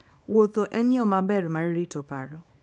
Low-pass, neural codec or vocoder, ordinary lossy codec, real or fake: 10.8 kHz; codec, 24 kHz, 0.9 kbps, WavTokenizer, small release; none; fake